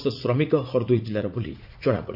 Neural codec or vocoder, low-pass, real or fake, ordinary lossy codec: vocoder, 44.1 kHz, 80 mel bands, Vocos; 5.4 kHz; fake; AAC, 48 kbps